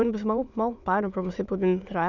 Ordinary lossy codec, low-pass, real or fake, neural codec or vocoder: none; 7.2 kHz; fake; autoencoder, 22.05 kHz, a latent of 192 numbers a frame, VITS, trained on many speakers